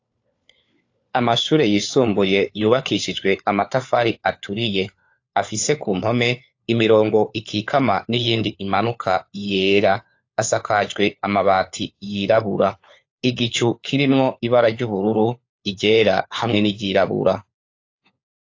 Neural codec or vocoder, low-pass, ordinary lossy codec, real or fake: codec, 16 kHz, 4 kbps, FunCodec, trained on LibriTTS, 50 frames a second; 7.2 kHz; AAC, 48 kbps; fake